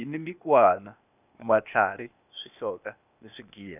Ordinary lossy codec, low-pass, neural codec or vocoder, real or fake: none; 3.6 kHz; codec, 16 kHz, 0.8 kbps, ZipCodec; fake